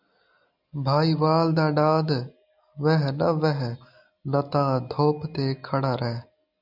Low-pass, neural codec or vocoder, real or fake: 5.4 kHz; none; real